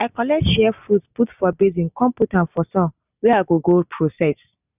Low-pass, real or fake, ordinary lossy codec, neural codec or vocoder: 3.6 kHz; real; none; none